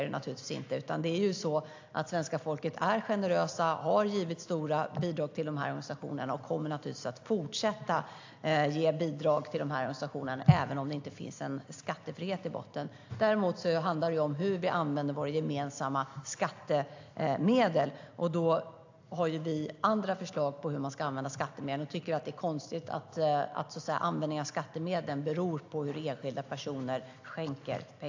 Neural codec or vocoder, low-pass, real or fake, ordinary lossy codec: none; 7.2 kHz; real; AAC, 48 kbps